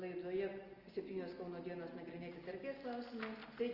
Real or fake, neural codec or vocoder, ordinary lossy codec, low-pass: real; none; Opus, 24 kbps; 5.4 kHz